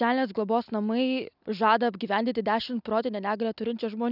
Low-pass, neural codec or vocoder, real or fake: 5.4 kHz; none; real